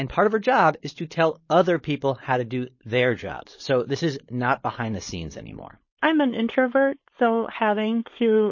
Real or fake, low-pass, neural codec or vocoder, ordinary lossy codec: fake; 7.2 kHz; codec, 16 kHz, 4.8 kbps, FACodec; MP3, 32 kbps